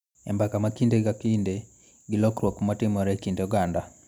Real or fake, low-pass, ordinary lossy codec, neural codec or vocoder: real; 19.8 kHz; none; none